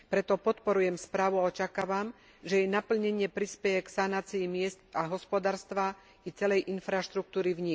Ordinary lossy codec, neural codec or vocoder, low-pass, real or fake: none; none; none; real